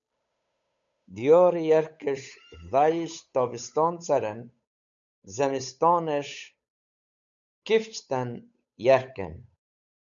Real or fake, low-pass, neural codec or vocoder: fake; 7.2 kHz; codec, 16 kHz, 8 kbps, FunCodec, trained on Chinese and English, 25 frames a second